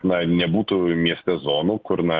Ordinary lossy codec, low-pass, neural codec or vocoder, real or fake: Opus, 32 kbps; 7.2 kHz; none; real